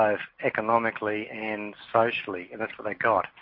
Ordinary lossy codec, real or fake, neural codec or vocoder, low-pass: MP3, 32 kbps; real; none; 5.4 kHz